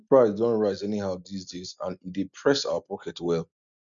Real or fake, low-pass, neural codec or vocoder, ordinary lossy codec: real; 7.2 kHz; none; MP3, 96 kbps